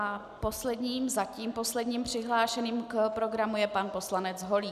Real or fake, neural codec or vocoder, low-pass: real; none; 14.4 kHz